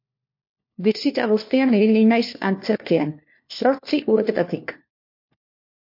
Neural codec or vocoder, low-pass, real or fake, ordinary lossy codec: codec, 16 kHz, 1 kbps, FunCodec, trained on LibriTTS, 50 frames a second; 5.4 kHz; fake; MP3, 32 kbps